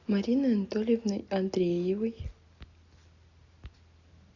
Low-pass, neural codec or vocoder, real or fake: 7.2 kHz; none; real